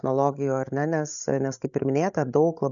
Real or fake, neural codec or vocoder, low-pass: fake; codec, 16 kHz, 16 kbps, FreqCodec, larger model; 7.2 kHz